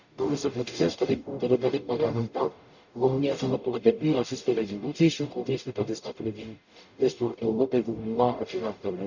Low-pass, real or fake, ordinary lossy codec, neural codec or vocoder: 7.2 kHz; fake; none; codec, 44.1 kHz, 0.9 kbps, DAC